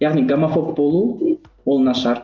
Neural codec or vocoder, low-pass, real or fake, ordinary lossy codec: none; 7.2 kHz; real; Opus, 24 kbps